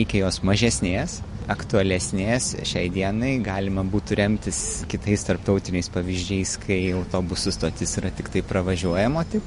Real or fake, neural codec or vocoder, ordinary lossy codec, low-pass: real; none; MP3, 48 kbps; 10.8 kHz